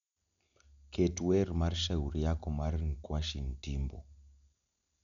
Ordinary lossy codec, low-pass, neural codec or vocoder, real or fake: none; 7.2 kHz; none; real